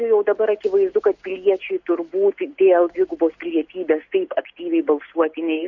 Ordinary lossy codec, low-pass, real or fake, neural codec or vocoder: Opus, 64 kbps; 7.2 kHz; real; none